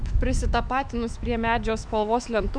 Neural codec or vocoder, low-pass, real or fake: autoencoder, 48 kHz, 128 numbers a frame, DAC-VAE, trained on Japanese speech; 9.9 kHz; fake